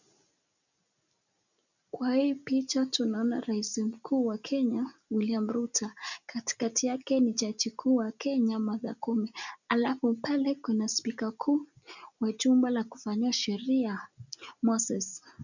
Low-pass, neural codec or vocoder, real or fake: 7.2 kHz; none; real